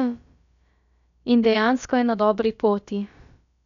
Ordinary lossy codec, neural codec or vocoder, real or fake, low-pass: none; codec, 16 kHz, about 1 kbps, DyCAST, with the encoder's durations; fake; 7.2 kHz